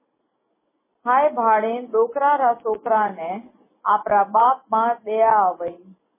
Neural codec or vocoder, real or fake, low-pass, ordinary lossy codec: none; real; 3.6 kHz; MP3, 16 kbps